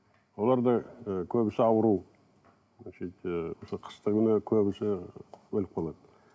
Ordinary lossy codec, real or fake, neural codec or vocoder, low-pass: none; real; none; none